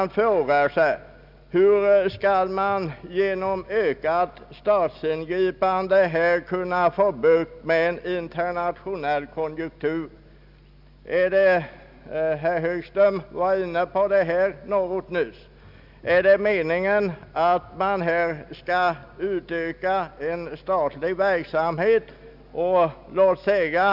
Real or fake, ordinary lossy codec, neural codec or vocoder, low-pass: real; none; none; 5.4 kHz